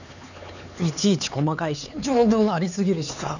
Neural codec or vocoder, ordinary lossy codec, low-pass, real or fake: codec, 16 kHz, 4 kbps, X-Codec, HuBERT features, trained on LibriSpeech; none; 7.2 kHz; fake